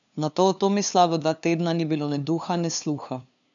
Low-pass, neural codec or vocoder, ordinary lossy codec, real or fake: 7.2 kHz; codec, 16 kHz, 2 kbps, FunCodec, trained on LibriTTS, 25 frames a second; none; fake